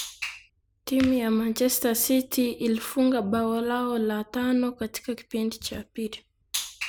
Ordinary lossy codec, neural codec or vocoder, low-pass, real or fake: none; none; none; real